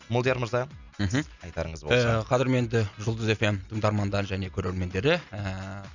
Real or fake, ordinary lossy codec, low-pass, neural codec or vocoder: real; none; 7.2 kHz; none